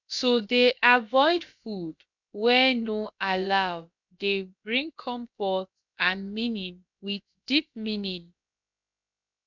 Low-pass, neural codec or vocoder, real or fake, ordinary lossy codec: 7.2 kHz; codec, 16 kHz, about 1 kbps, DyCAST, with the encoder's durations; fake; none